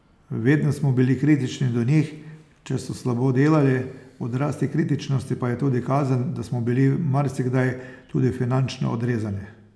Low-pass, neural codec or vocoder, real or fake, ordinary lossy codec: none; none; real; none